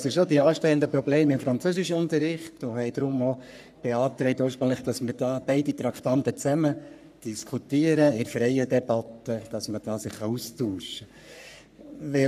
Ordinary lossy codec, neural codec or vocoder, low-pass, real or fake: none; codec, 44.1 kHz, 3.4 kbps, Pupu-Codec; 14.4 kHz; fake